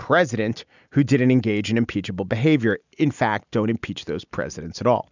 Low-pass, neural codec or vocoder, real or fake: 7.2 kHz; none; real